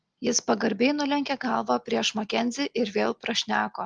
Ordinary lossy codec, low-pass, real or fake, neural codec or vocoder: Opus, 24 kbps; 9.9 kHz; real; none